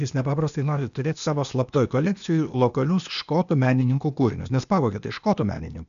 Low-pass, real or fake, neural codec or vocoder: 7.2 kHz; fake; codec, 16 kHz, 0.8 kbps, ZipCodec